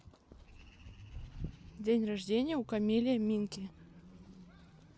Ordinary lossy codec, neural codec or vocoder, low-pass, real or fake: none; none; none; real